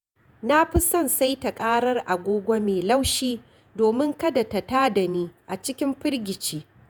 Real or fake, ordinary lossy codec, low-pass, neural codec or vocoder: fake; none; none; vocoder, 48 kHz, 128 mel bands, Vocos